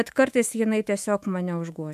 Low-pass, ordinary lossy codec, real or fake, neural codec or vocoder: 14.4 kHz; AAC, 96 kbps; fake; autoencoder, 48 kHz, 128 numbers a frame, DAC-VAE, trained on Japanese speech